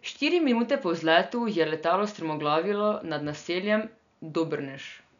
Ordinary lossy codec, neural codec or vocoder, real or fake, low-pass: none; none; real; 7.2 kHz